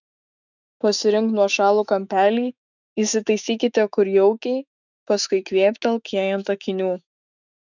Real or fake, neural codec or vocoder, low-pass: fake; autoencoder, 48 kHz, 128 numbers a frame, DAC-VAE, trained on Japanese speech; 7.2 kHz